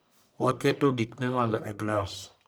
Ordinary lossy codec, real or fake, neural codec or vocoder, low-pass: none; fake; codec, 44.1 kHz, 1.7 kbps, Pupu-Codec; none